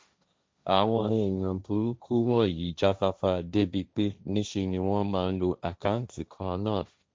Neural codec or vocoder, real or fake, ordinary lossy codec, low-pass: codec, 16 kHz, 1.1 kbps, Voila-Tokenizer; fake; none; none